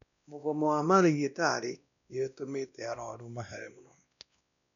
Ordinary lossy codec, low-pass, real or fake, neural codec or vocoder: none; 7.2 kHz; fake; codec, 16 kHz, 1 kbps, X-Codec, WavLM features, trained on Multilingual LibriSpeech